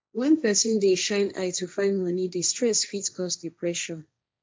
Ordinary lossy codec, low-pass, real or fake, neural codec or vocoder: none; none; fake; codec, 16 kHz, 1.1 kbps, Voila-Tokenizer